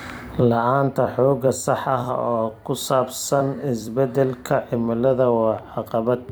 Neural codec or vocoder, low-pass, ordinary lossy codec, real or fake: vocoder, 44.1 kHz, 128 mel bands every 256 samples, BigVGAN v2; none; none; fake